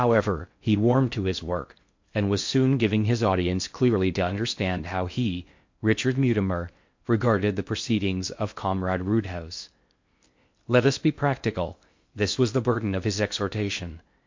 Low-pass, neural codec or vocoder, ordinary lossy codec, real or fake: 7.2 kHz; codec, 16 kHz in and 24 kHz out, 0.6 kbps, FocalCodec, streaming, 4096 codes; MP3, 48 kbps; fake